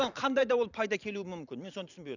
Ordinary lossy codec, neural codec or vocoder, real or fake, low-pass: none; vocoder, 44.1 kHz, 128 mel bands every 512 samples, BigVGAN v2; fake; 7.2 kHz